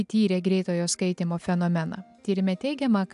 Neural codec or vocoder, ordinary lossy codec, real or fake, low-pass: none; AAC, 96 kbps; real; 10.8 kHz